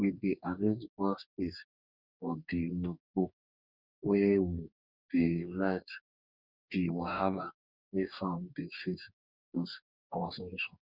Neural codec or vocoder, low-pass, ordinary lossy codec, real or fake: codec, 44.1 kHz, 2.6 kbps, DAC; 5.4 kHz; none; fake